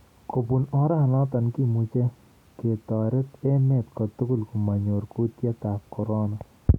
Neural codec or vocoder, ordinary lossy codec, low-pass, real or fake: none; none; 19.8 kHz; real